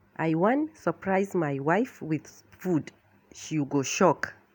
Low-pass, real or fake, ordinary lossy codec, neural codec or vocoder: none; real; none; none